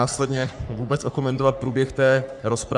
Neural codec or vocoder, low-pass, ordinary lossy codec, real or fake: codec, 44.1 kHz, 3.4 kbps, Pupu-Codec; 10.8 kHz; MP3, 96 kbps; fake